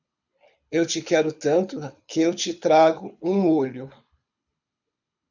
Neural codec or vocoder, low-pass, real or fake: codec, 24 kHz, 6 kbps, HILCodec; 7.2 kHz; fake